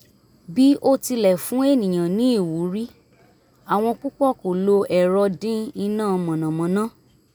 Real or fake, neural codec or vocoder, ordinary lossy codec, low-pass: real; none; none; none